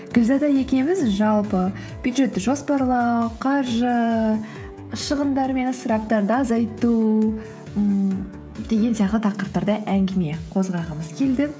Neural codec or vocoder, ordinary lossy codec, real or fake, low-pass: codec, 16 kHz, 16 kbps, FreqCodec, smaller model; none; fake; none